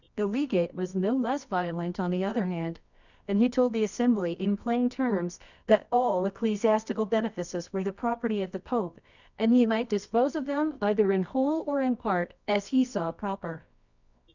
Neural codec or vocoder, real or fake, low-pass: codec, 24 kHz, 0.9 kbps, WavTokenizer, medium music audio release; fake; 7.2 kHz